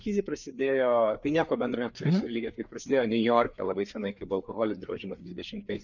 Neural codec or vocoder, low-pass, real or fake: codec, 16 kHz, 4 kbps, FreqCodec, larger model; 7.2 kHz; fake